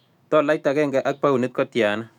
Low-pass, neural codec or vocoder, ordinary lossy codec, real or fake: 19.8 kHz; autoencoder, 48 kHz, 128 numbers a frame, DAC-VAE, trained on Japanese speech; none; fake